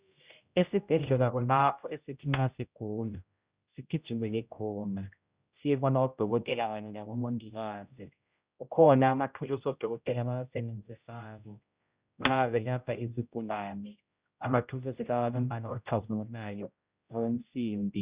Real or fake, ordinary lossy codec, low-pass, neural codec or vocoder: fake; Opus, 64 kbps; 3.6 kHz; codec, 16 kHz, 0.5 kbps, X-Codec, HuBERT features, trained on general audio